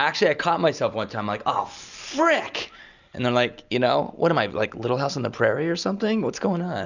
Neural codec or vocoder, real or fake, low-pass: none; real; 7.2 kHz